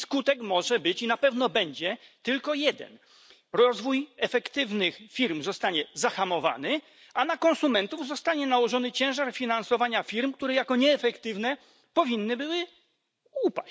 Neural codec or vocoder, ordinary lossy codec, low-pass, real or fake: none; none; none; real